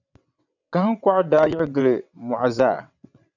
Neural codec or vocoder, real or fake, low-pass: vocoder, 22.05 kHz, 80 mel bands, WaveNeXt; fake; 7.2 kHz